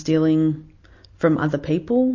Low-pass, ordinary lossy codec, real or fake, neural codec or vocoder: 7.2 kHz; MP3, 32 kbps; real; none